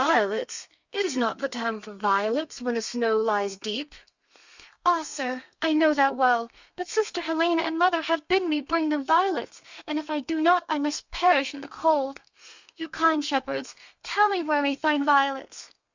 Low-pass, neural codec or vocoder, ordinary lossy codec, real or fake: 7.2 kHz; codec, 32 kHz, 1.9 kbps, SNAC; Opus, 64 kbps; fake